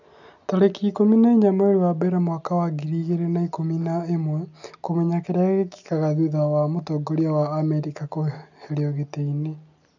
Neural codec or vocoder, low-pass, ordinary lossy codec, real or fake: none; 7.2 kHz; none; real